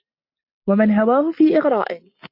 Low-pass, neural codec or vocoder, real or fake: 5.4 kHz; none; real